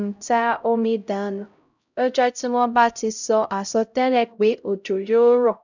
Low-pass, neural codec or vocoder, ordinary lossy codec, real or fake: 7.2 kHz; codec, 16 kHz, 0.5 kbps, X-Codec, HuBERT features, trained on LibriSpeech; none; fake